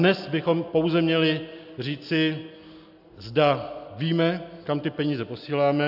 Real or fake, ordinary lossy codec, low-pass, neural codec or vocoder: real; AAC, 48 kbps; 5.4 kHz; none